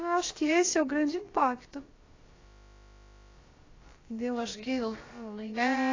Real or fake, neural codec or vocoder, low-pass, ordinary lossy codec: fake; codec, 16 kHz, about 1 kbps, DyCAST, with the encoder's durations; 7.2 kHz; AAC, 32 kbps